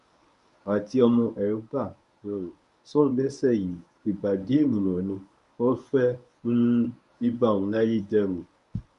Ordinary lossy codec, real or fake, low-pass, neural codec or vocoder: none; fake; 10.8 kHz; codec, 24 kHz, 0.9 kbps, WavTokenizer, medium speech release version 1